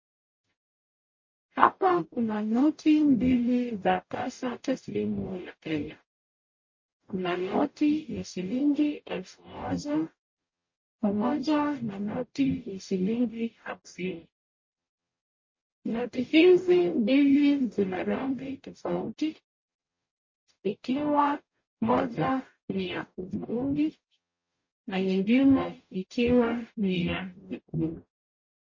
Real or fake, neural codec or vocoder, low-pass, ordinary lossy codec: fake; codec, 44.1 kHz, 0.9 kbps, DAC; 7.2 kHz; MP3, 32 kbps